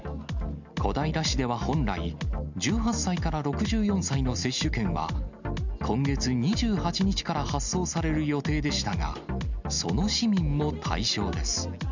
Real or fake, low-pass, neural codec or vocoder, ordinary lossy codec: real; 7.2 kHz; none; none